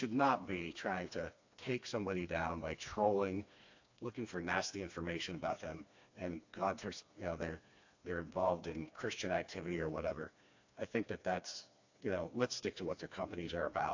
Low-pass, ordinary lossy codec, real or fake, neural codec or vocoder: 7.2 kHz; AAC, 48 kbps; fake; codec, 16 kHz, 2 kbps, FreqCodec, smaller model